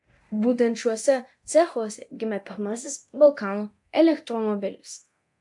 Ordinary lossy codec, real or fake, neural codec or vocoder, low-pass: AAC, 64 kbps; fake; codec, 24 kHz, 0.9 kbps, DualCodec; 10.8 kHz